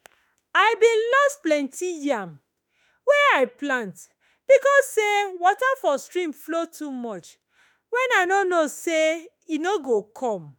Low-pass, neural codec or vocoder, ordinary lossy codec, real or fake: none; autoencoder, 48 kHz, 32 numbers a frame, DAC-VAE, trained on Japanese speech; none; fake